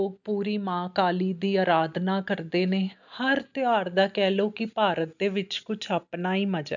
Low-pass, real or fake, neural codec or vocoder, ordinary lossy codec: 7.2 kHz; real; none; none